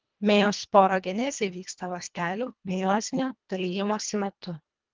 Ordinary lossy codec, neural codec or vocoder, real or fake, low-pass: Opus, 24 kbps; codec, 24 kHz, 1.5 kbps, HILCodec; fake; 7.2 kHz